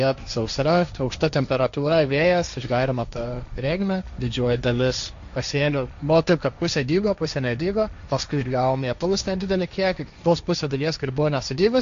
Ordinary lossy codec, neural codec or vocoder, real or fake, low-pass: MP3, 64 kbps; codec, 16 kHz, 1.1 kbps, Voila-Tokenizer; fake; 7.2 kHz